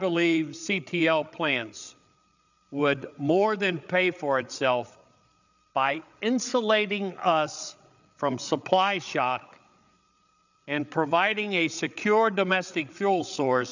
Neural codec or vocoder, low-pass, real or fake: codec, 16 kHz, 8 kbps, FreqCodec, larger model; 7.2 kHz; fake